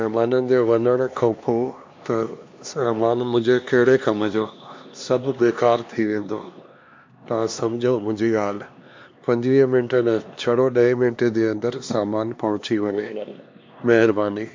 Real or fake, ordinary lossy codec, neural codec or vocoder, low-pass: fake; MP3, 48 kbps; codec, 16 kHz, 2 kbps, X-Codec, HuBERT features, trained on LibriSpeech; 7.2 kHz